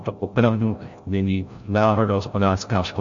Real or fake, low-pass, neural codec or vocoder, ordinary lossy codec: fake; 7.2 kHz; codec, 16 kHz, 0.5 kbps, FreqCodec, larger model; MP3, 48 kbps